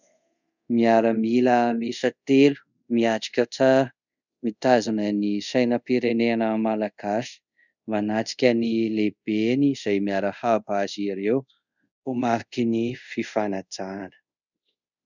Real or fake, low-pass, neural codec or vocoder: fake; 7.2 kHz; codec, 24 kHz, 0.5 kbps, DualCodec